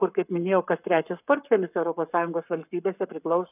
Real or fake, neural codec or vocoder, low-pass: fake; codec, 44.1 kHz, 7.8 kbps, Pupu-Codec; 3.6 kHz